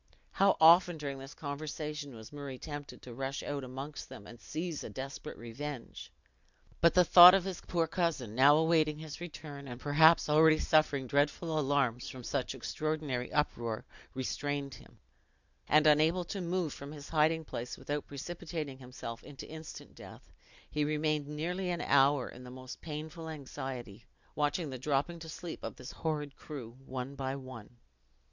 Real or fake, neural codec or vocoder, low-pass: real; none; 7.2 kHz